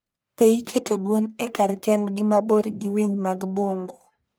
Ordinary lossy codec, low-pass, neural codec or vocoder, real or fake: none; none; codec, 44.1 kHz, 1.7 kbps, Pupu-Codec; fake